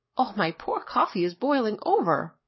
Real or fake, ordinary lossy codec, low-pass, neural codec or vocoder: fake; MP3, 24 kbps; 7.2 kHz; codec, 44.1 kHz, 7.8 kbps, DAC